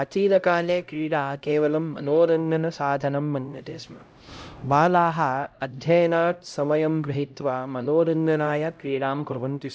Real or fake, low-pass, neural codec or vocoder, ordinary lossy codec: fake; none; codec, 16 kHz, 0.5 kbps, X-Codec, HuBERT features, trained on LibriSpeech; none